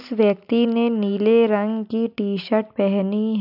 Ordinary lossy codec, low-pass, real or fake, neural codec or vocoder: none; 5.4 kHz; real; none